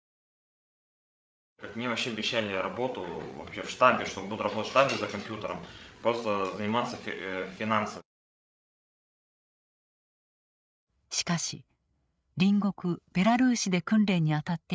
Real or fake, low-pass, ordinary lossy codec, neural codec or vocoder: fake; none; none; codec, 16 kHz, 8 kbps, FreqCodec, larger model